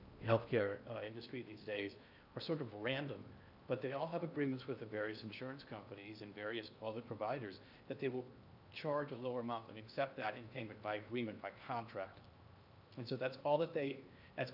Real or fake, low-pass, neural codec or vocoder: fake; 5.4 kHz; codec, 16 kHz in and 24 kHz out, 0.8 kbps, FocalCodec, streaming, 65536 codes